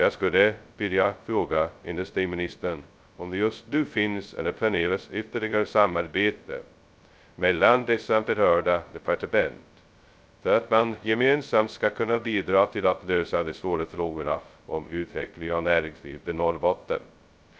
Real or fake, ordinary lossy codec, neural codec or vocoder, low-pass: fake; none; codec, 16 kHz, 0.2 kbps, FocalCodec; none